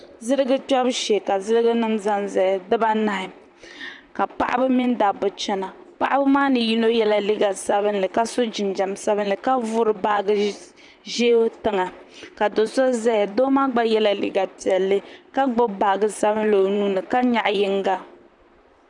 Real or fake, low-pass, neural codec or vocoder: fake; 10.8 kHz; vocoder, 44.1 kHz, 128 mel bands, Pupu-Vocoder